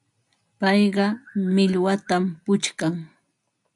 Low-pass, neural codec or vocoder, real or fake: 10.8 kHz; none; real